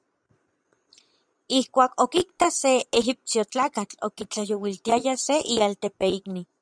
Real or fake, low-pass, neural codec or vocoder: fake; 9.9 kHz; vocoder, 22.05 kHz, 80 mel bands, Vocos